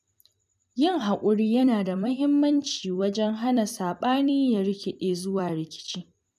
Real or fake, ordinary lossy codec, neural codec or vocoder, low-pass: fake; none; vocoder, 44.1 kHz, 128 mel bands every 256 samples, BigVGAN v2; 14.4 kHz